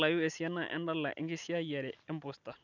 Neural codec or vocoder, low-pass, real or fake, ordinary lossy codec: none; 7.2 kHz; real; none